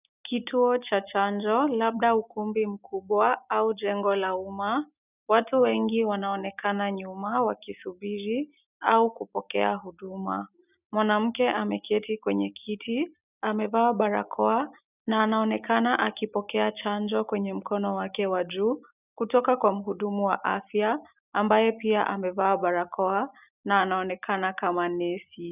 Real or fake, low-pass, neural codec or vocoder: real; 3.6 kHz; none